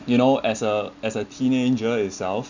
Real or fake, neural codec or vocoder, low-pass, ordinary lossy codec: real; none; 7.2 kHz; none